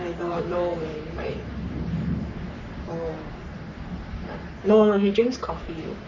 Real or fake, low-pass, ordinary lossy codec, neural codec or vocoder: fake; 7.2 kHz; none; codec, 44.1 kHz, 3.4 kbps, Pupu-Codec